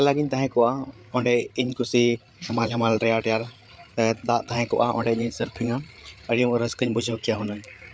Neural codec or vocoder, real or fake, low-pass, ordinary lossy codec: codec, 16 kHz, 8 kbps, FreqCodec, larger model; fake; none; none